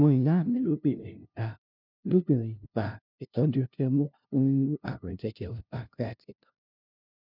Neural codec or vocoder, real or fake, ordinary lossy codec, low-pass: codec, 16 kHz, 0.5 kbps, FunCodec, trained on LibriTTS, 25 frames a second; fake; none; 5.4 kHz